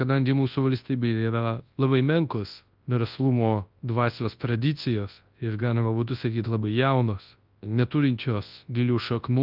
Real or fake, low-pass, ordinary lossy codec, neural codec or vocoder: fake; 5.4 kHz; Opus, 32 kbps; codec, 24 kHz, 0.9 kbps, WavTokenizer, large speech release